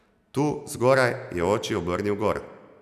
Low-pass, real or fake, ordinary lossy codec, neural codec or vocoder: 14.4 kHz; real; none; none